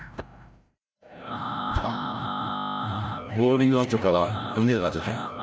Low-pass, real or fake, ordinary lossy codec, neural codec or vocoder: none; fake; none; codec, 16 kHz, 0.5 kbps, FreqCodec, larger model